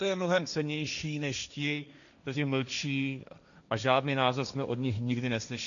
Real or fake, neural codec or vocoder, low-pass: fake; codec, 16 kHz, 1.1 kbps, Voila-Tokenizer; 7.2 kHz